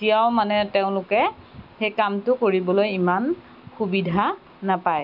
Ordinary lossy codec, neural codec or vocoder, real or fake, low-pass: none; none; real; 5.4 kHz